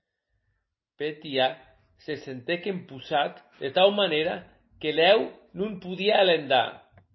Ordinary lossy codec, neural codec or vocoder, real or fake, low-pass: MP3, 24 kbps; none; real; 7.2 kHz